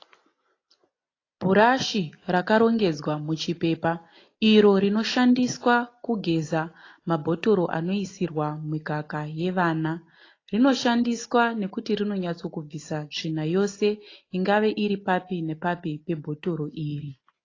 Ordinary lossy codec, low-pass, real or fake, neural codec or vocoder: AAC, 32 kbps; 7.2 kHz; real; none